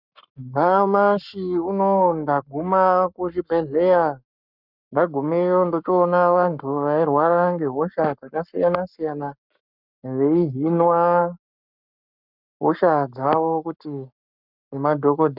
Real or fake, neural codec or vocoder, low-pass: fake; codec, 44.1 kHz, 7.8 kbps, Pupu-Codec; 5.4 kHz